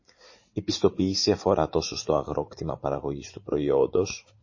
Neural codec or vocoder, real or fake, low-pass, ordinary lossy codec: vocoder, 44.1 kHz, 80 mel bands, Vocos; fake; 7.2 kHz; MP3, 32 kbps